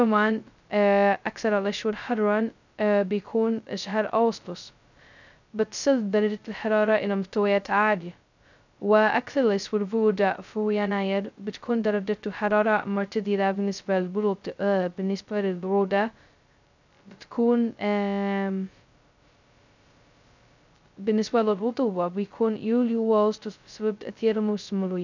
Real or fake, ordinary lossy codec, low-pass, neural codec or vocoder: fake; none; 7.2 kHz; codec, 16 kHz, 0.2 kbps, FocalCodec